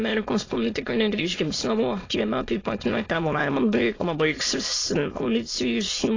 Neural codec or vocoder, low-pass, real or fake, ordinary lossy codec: autoencoder, 22.05 kHz, a latent of 192 numbers a frame, VITS, trained on many speakers; 7.2 kHz; fake; AAC, 32 kbps